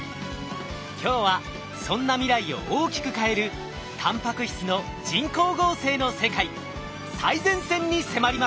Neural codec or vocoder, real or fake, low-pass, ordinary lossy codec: none; real; none; none